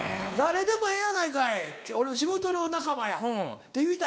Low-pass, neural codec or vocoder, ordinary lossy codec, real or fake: none; codec, 16 kHz, 2 kbps, X-Codec, WavLM features, trained on Multilingual LibriSpeech; none; fake